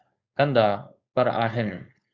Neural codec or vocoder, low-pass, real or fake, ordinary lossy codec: codec, 16 kHz, 4.8 kbps, FACodec; 7.2 kHz; fake; Opus, 64 kbps